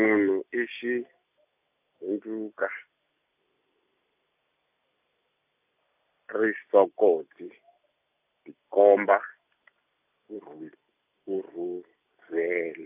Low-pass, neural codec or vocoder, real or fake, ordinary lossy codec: 3.6 kHz; none; real; AAC, 32 kbps